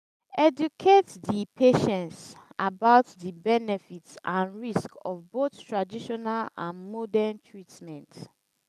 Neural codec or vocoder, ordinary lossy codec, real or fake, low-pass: none; none; real; 14.4 kHz